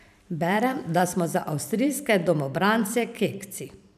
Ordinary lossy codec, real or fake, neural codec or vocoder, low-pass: none; fake; vocoder, 44.1 kHz, 128 mel bands every 512 samples, BigVGAN v2; 14.4 kHz